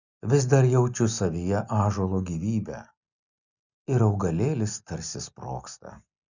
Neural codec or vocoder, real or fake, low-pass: none; real; 7.2 kHz